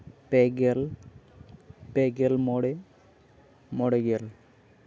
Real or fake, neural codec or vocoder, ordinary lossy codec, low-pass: real; none; none; none